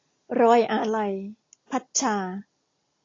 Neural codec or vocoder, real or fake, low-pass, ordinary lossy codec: none; real; 7.2 kHz; AAC, 32 kbps